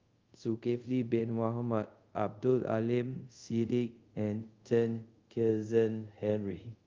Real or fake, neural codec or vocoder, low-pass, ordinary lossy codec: fake; codec, 24 kHz, 0.5 kbps, DualCodec; 7.2 kHz; Opus, 24 kbps